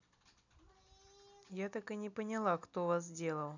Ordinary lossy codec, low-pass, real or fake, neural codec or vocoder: none; 7.2 kHz; real; none